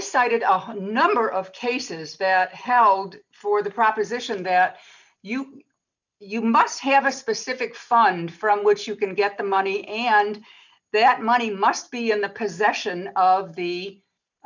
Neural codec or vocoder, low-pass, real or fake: none; 7.2 kHz; real